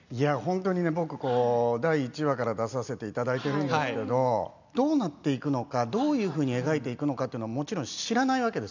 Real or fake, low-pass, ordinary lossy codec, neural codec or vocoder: real; 7.2 kHz; none; none